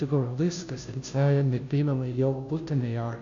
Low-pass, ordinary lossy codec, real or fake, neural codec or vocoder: 7.2 kHz; AAC, 64 kbps; fake; codec, 16 kHz, 0.5 kbps, FunCodec, trained on Chinese and English, 25 frames a second